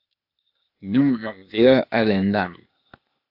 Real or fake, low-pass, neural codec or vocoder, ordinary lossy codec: fake; 5.4 kHz; codec, 16 kHz, 0.8 kbps, ZipCodec; AAC, 48 kbps